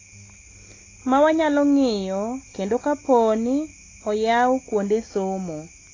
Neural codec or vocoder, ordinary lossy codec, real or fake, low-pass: none; AAC, 32 kbps; real; 7.2 kHz